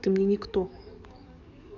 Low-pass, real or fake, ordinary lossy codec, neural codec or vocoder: 7.2 kHz; fake; none; codec, 44.1 kHz, 7.8 kbps, DAC